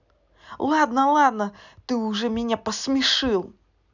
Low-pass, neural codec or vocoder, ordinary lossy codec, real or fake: 7.2 kHz; none; none; real